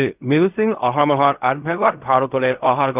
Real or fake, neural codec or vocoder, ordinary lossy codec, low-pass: fake; codec, 16 kHz in and 24 kHz out, 0.4 kbps, LongCat-Audio-Codec, fine tuned four codebook decoder; none; 3.6 kHz